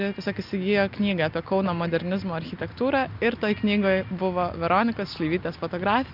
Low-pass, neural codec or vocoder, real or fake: 5.4 kHz; none; real